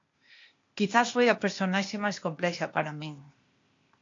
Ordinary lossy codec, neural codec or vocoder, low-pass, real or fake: AAC, 48 kbps; codec, 16 kHz, 0.8 kbps, ZipCodec; 7.2 kHz; fake